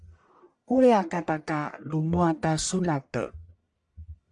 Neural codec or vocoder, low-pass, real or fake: codec, 44.1 kHz, 1.7 kbps, Pupu-Codec; 10.8 kHz; fake